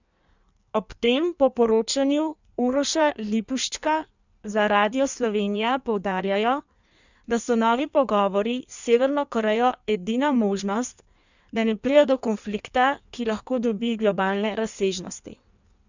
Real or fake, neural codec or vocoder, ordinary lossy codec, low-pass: fake; codec, 16 kHz in and 24 kHz out, 1.1 kbps, FireRedTTS-2 codec; none; 7.2 kHz